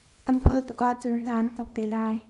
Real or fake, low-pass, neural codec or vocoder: fake; 10.8 kHz; codec, 24 kHz, 0.9 kbps, WavTokenizer, small release